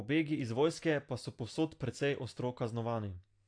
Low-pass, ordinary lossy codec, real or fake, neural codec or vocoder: 9.9 kHz; AAC, 48 kbps; real; none